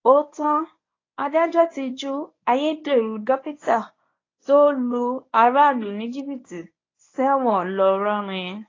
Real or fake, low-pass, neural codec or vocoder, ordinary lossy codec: fake; 7.2 kHz; codec, 24 kHz, 0.9 kbps, WavTokenizer, medium speech release version 1; AAC, 32 kbps